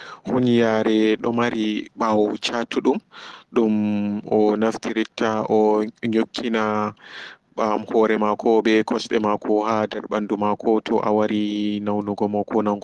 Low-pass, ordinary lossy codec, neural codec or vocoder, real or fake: 10.8 kHz; Opus, 16 kbps; none; real